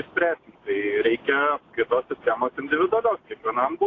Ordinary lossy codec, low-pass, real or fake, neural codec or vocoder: AAC, 32 kbps; 7.2 kHz; real; none